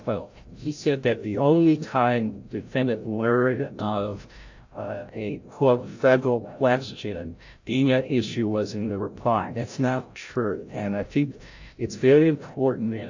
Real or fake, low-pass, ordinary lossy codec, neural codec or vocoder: fake; 7.2 kHz; AAC, 48 kbps; codec, 16 kHz, 0.5 kbps, FreqCodec, larger model